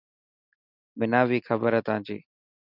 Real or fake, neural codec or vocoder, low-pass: real; none; 5.4 kHz